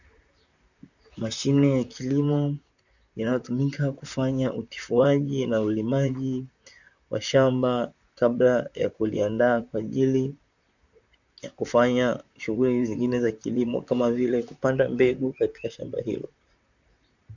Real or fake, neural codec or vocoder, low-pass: fake; vocoder, 44.1 kHz, 128 mel bands, Pupu-Vocoder; 7.2 kHz